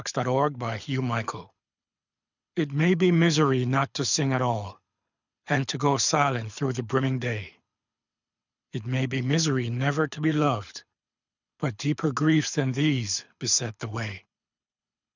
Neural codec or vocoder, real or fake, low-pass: codec, 24 kHz, 6 kbps, HILCodec; fake; 7.2 kHz